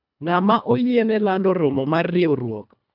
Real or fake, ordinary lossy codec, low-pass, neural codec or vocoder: fake; none; 5.4 kHz; codec, 24 kHz, 1.5 kbps, HILCodec